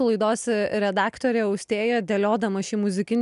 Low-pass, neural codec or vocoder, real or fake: 10.8 kHz; none; real